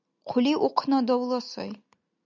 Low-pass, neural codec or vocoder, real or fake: 7.2 kHz; none; real